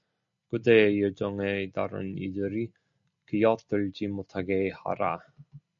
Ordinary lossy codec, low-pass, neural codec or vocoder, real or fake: MP3, 48 kbps; 7.2 kHz; none; real